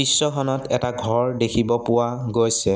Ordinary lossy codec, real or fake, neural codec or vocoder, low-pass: none; real; none; none